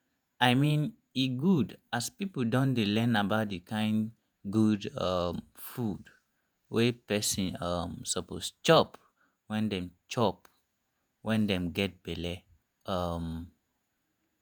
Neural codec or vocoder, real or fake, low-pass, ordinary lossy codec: vocoder, 48 kHz, 128 mel bands, Vocos; fake; none; none